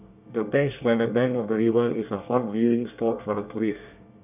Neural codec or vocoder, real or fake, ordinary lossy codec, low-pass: codec, 24 kHz, 1 kbps, SNAC; fake; none; 3.6 kHz